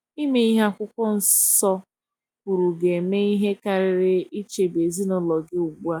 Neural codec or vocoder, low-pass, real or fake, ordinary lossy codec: none; none; real; none